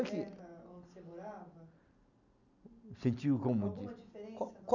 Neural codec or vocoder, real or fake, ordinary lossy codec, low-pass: none; real; none; 7.2 kHz